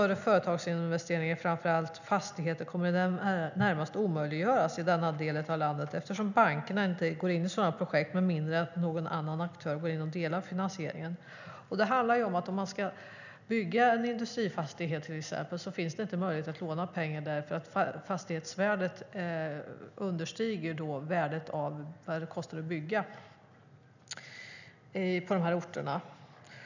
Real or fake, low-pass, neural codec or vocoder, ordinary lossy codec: real; 7.2 kHz; none; none